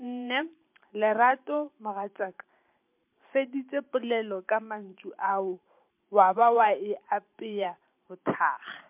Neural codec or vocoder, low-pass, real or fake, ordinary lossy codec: vocoder, 44.1 kHz, 128 mel bands every 512 samples, BigVGAN v2; 3.6 kHz; fake; MP3, 32 kbps